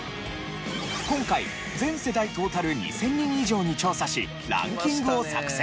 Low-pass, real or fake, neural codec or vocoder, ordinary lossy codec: none; real; none; none